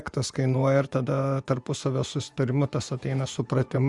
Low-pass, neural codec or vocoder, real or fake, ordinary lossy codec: 10.8 kHz; vocoder, 24 kHz, 100 mel bands, Vocos; fake; Opus, 64 kbps